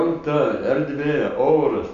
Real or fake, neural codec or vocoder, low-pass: real; none; 7.2 kHz